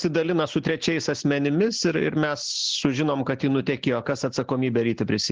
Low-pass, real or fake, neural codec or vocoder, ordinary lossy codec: 7.2 kHz; real; none; Opus, 16 kbps